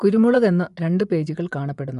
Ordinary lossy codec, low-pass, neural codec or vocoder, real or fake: none; 10.8 kHz; vocoder, 24 kHz, 100 mel bands, Vocos; fake